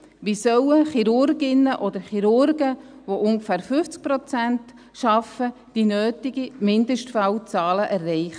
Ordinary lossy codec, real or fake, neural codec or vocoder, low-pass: none; real; none; 9.9 kHz